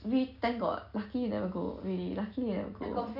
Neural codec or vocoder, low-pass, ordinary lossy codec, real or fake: none; 5.4 kHz; none; real